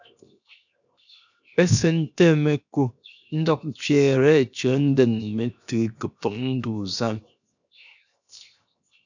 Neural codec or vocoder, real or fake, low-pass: codec, 16 kHz, 0.7 kbps, FocalCodec; fake; 7.2 kHz